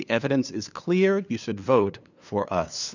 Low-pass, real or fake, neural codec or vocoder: 7.2 kHz; fake; codec, 16 kHz, 2 kbps, FunCodec, trained on LibriTTS, 25 frames a second